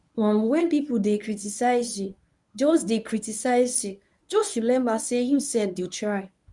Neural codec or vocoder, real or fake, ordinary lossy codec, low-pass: codec, 24 kHz, 0.9 kbps, WavTokenizer, medium speech release version 1; fake; none; 10.8 kHz